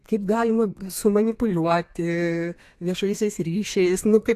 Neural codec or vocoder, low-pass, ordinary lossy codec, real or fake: codec, 32 kHz, 1.9 kbps, SNAC; 14.4 kHz; AAC, 64 kbps; fake